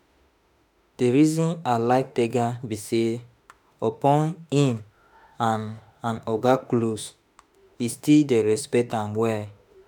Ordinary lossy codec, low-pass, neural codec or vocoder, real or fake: none; none; autoencoder, 48 kHz, 32 numbers a frame, DAC-VAE, trained on Japanese speech; fake